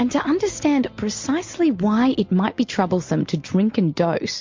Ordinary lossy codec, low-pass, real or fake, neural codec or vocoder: MP3, 48 kbps; 7.2 kHz; real; none